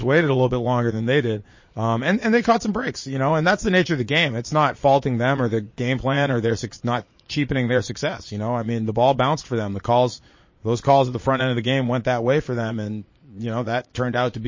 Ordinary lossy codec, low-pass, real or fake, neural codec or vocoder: MP3, 32 kbps; 7.2 kHz; fake; vocoder, 22.05 kHz, 80 mel bands, Vocos